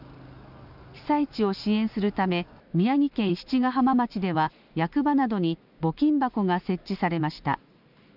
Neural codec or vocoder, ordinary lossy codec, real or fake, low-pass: none; none; real; 5.4 kHz